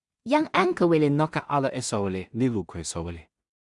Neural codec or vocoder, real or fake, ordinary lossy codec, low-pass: codec, 16 kHz in and 24 kHz out, 0.4 kbps, LongCat-Audio-Codec, two codebook decoder; fake; Opus, 64 kbps; 10.8 kHz